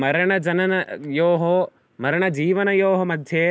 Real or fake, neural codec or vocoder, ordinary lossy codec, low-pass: real; none; none; none